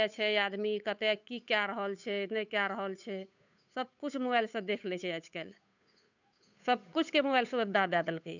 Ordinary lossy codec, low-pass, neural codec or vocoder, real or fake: none; 7.2 kHz; codec, 16 kHz, 8 kbps, FunCodec, trained on Chinese and English, 25 frames a second; fake